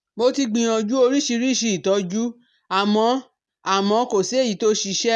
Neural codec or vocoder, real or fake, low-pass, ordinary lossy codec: none; real; none; none